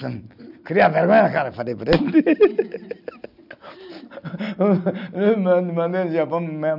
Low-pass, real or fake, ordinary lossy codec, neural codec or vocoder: 5.4 kHz; real; none; none